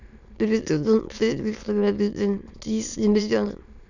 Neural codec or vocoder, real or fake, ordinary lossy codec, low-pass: autoencoder, 22.05 kHz, a latent of 192 numbers a frame, VITS, trained on many speakers; fake; none; 7.2 kHz